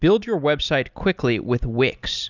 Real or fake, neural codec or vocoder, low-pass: real; none; 7.2 kHz